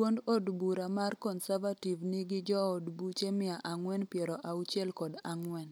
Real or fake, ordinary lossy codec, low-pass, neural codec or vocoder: real; none; none; none